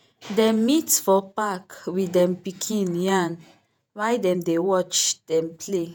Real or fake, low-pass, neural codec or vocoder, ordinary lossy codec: fake; none; vocoder, 48 kHz, 128 mel bands, Vocos; none